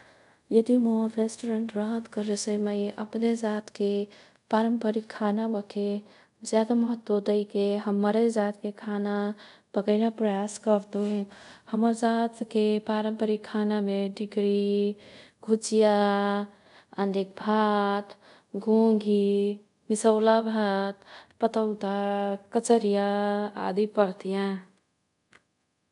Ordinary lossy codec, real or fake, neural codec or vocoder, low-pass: none; fake; codec, 24 kHz, 0.5 kbps, DualCodec; 10.8 kHz